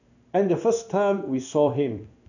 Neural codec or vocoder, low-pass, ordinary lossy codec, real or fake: codec, 16 kHz in and 24 kHz out, 1 kbps, XY-Tokenizer; 7.2 kHz; none; fake